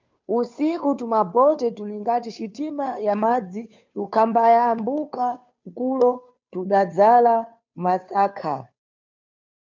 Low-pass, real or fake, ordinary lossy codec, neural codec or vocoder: 7.2 kHz; fake; MP3, 64 kbps; codec, 16 kHz, 2 kbps, FunCodec, trained on Chinese and English, 25 frames a second